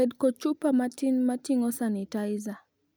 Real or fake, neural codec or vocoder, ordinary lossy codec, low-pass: real; none; none; none